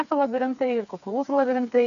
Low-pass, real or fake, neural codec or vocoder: 7.2 kHz; fake; codec, 16 kHz, 4 kbps, FreqCodec, smaller model